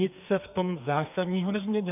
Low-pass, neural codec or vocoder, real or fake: 3.6 kHz; codec, 32 kHz, 1.9 kbps, SNAC; fake